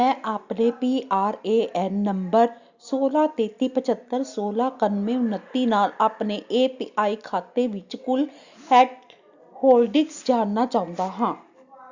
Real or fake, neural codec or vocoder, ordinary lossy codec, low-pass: real; none; Opus, 64 kbps; 7.2 kHz